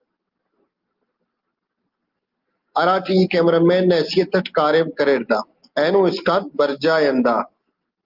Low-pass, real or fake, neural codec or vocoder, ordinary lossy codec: 5.4 kHz; real; none; Opus, 32 kbps